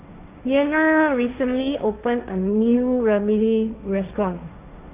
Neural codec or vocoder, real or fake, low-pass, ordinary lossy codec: codec, 16 kHz, 1.1 kbps, Voila-Tokenizer; fake; 3.6 kHz; Opus, 64 kbps